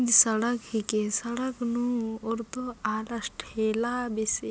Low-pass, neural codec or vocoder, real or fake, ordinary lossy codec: none; none; real; none